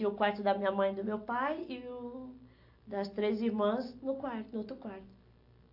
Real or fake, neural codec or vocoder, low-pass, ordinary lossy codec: real; none; 5.4 kHz; AAC, 48 kbps